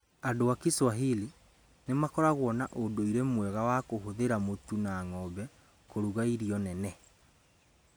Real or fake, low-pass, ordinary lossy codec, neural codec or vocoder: real; none; none; none